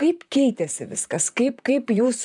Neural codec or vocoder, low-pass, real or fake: vocoder, 44.1 kHz, 128 mel bands, Pupu-Vocoder; 10.8 kHz; fake